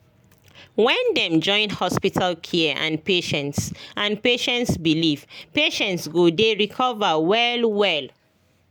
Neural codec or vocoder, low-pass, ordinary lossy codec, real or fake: none; none; none; real